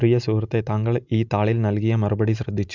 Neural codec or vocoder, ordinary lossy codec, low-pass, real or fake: none; none; 7.2 kHz; real